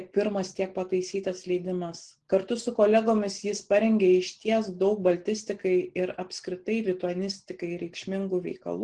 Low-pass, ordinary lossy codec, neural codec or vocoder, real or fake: 9.9 kHz; Opus, 16 kbps; none; real